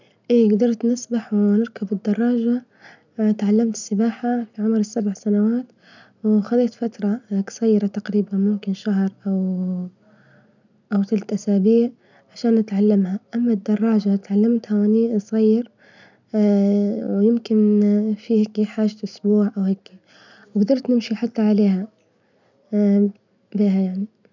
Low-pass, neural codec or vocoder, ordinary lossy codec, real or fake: 7.2 kHz; none; none; real